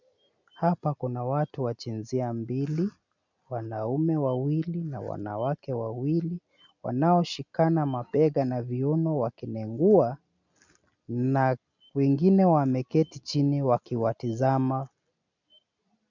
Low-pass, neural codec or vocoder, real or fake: 7.2 kHz; none; real